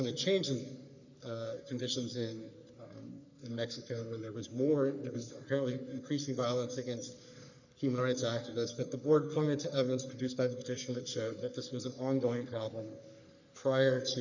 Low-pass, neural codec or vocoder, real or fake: 7.2 kHz; codec, 44.1 kHz, 3.4 kbps, Pupu-Codec; fake